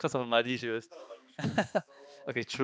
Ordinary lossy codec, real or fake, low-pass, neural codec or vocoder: none; fake; none; codec, 16 kHz, 2 kbps, X-Codec, HuBERT features, trained on balanced general audio